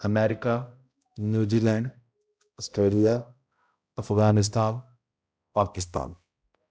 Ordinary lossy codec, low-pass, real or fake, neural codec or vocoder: none; none; fake; codec, 16 kHz, 0.5 kbps, X-Codec, HuBERT features, trained on balanced general audio